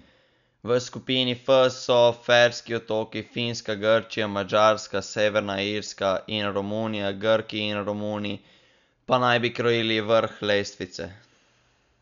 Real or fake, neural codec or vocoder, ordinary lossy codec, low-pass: real; none; none; 7.2 kHz